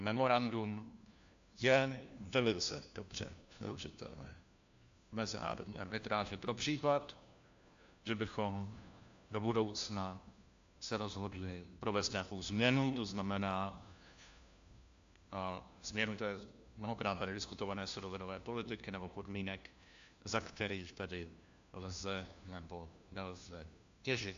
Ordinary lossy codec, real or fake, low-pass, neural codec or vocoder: AAC, 64 kbps; fake; 7.2 kHz; codec, 16 kHz, 1 kbps, FunCodec, trained on LibriTTS, 50 frames a second